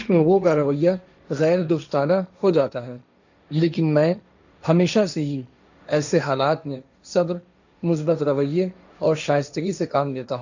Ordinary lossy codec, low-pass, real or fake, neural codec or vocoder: none; 7.2 kHz; fake; codec, 16 kHz, 1.1 kbps, Voila-Tokenizer